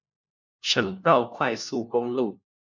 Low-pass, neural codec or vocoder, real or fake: 7.2 kHz; codec, 16 kHz, 1 kbps, FunCodec, trained on LibriTTS, 50 frames a second; fake